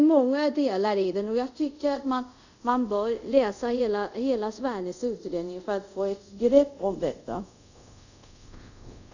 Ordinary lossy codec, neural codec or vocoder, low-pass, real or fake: none; codec, 24 kHz, 0.5 kbps, DualCodec; 7.2 kHz; fake